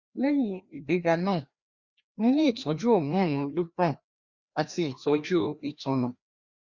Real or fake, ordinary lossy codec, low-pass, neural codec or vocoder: fake; Opus, 64 kbps; 7.2 kHz; codec, 16 kHz, 1 kbps, FreqCodec, larger model